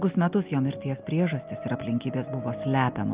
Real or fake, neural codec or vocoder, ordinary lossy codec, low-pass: real; none; Opus, 24 kbps; 3.6 kHz